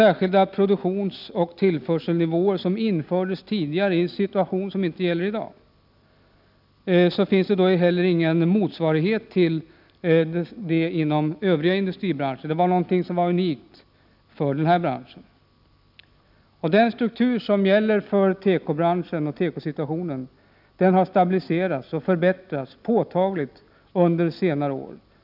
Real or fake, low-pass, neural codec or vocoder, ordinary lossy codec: real; 5.4 kHz; none; none